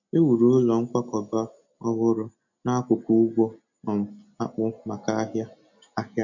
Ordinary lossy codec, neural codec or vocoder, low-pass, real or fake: none; none; 7.2 kHz; real